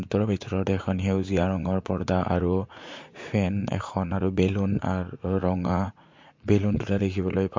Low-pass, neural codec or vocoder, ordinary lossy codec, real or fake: 7.2 kHz; vocoder, 44.1 kHz, 80 mel bands, Vocos; MP3, 48 kbps; fake